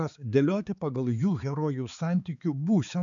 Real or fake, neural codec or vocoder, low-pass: fake; codec, 16 kHz, 4 kbps, X-Codec, HuBERT features, trained on balanced general audio; 7.2 kHz